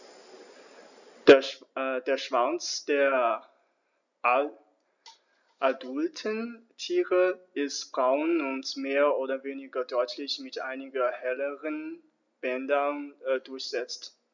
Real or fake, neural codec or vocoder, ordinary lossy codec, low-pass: real; none; none; 7.2 kHz